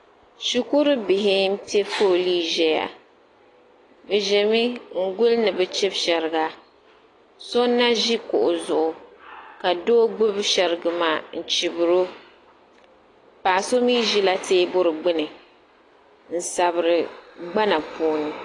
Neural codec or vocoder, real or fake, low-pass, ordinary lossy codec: none; real; 10.8 kHz; AAC, 32 kbps